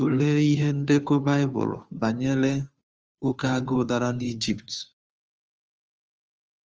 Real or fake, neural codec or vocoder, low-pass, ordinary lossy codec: fake; codec, 16 kHz, 2 kbps, FunCodec, trained on Chinese and English, 25 frames a second; 7.2 kHz; Opus, 24 kbps